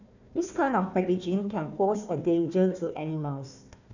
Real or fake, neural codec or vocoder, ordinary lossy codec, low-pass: fake; codec, 16 kHz, 1 kbps, FunCodec, trained on Chinese and English, 50 frames a second; none; 7.2 kHz